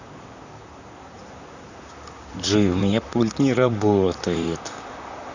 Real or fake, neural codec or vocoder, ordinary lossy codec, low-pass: fake; vocoder, 44.1 kHz, 128 mel bands, Pupu-Vocoder; none; 7.2 kHz